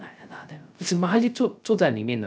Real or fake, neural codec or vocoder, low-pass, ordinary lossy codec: fake; codec, 16 kHz, 0.3 kbps, FocalCodec; none; none